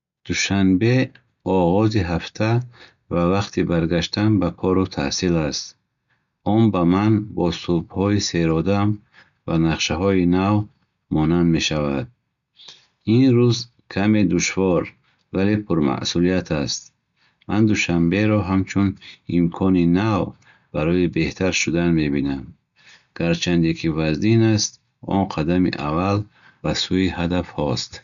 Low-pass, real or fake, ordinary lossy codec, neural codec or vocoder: 7.2 kHz; real; none; none